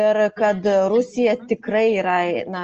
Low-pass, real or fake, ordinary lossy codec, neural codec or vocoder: 7.2 kHz; real; Opus, 24 kbps; none